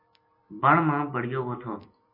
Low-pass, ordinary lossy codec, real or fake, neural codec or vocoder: 5.4 kHz; MP3, 24 kbps; fake; vocoder, 44.1 kHz, 128 mel bands every 256 samples, BigVGAN v2